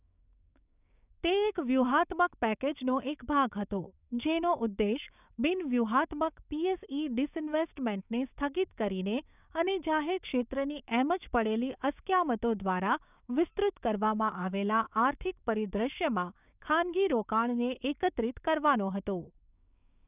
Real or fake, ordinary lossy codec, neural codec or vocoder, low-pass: fake; none; codec, 16 kHz, 6 kbps, DAC; 3.6 kHz